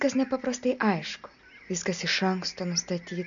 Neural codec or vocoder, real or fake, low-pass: none; real; 7.2 kHz